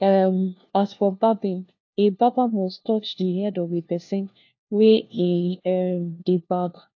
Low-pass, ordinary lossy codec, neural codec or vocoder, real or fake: 7.2 kHz; AAC, 48 kbps; codec, 16 kHz, 1 kbps, FunCodec, trained on LibriTTS, 50 frames a second; fake